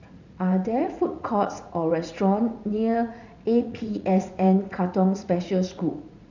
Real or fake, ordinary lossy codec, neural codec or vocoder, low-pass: fake; none; vocoder, 44.1 kHz, 80 mel bands, Vocos; 7.2 kHz